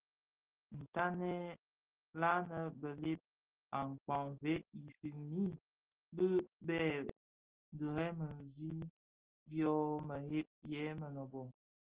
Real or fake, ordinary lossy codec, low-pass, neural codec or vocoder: real; Opus, 16 kbps; 3.6 kHz; none